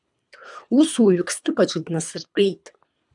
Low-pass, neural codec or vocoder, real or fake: 10.8 kHz; codec, 24 kHz, 3 kbps, HILCodec; fake